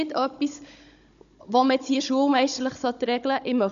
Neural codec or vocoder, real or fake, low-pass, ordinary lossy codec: codec, 16 kHz, 16 kbps, FunCodec, trained on Chinese and English, 50 frames a second; fake; 7.2 kHz; none